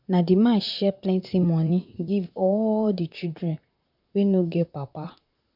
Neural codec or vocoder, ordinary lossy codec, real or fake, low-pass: vocoder, 44.1 kHz, 128 mel bands, Pupu-Vocoder; none; fake; 5.4 kHz